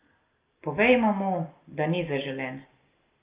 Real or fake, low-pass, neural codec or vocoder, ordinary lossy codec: real; 3.6 kHz; none; Opus, 24 kbps